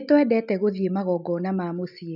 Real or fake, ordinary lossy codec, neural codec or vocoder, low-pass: real; none; none; 5.4 kHz